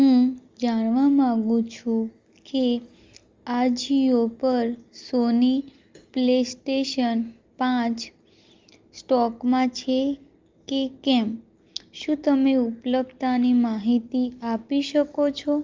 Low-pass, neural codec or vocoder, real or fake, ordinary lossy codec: 7.2 kHz; none; real; Opus, 32 kbps